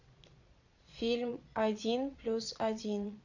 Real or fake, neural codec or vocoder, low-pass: fake; vocoder, 22.05 kHz, 80 mel bands, Vocos; 7.2 kHz